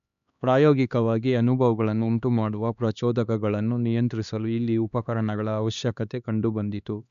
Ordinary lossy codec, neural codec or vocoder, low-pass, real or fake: none; codec, 16 kHz, 2 kbps, X-Codec, HuBERT features, trained on LibriSpeech; 7.2 kHz; fake